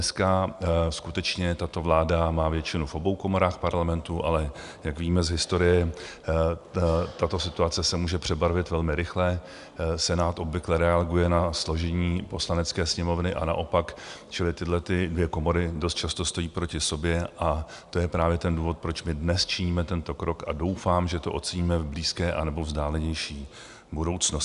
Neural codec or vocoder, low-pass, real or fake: none; 10.8 kHz; real